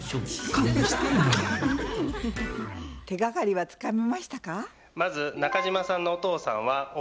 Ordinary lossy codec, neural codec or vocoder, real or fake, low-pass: none; none; real; none